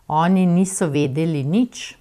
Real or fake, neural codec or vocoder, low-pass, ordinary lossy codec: fake; vocoder, 44.1 kHz, 128 mel bands every 256 samples, BigVGAN v2; 14.4 kHz; none